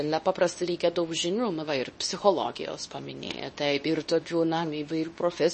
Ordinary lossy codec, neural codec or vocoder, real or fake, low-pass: MP3, 32 kbps; codec, 24 kHz, 0.9 kbps, WavTokenizer, medium speech release version 2; fake; 10.8 kHz